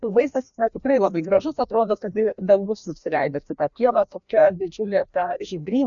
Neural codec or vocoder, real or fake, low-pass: codec, 16 kHz, 1 kbps, FreqCodec, larger model; fake; 7.2 kHz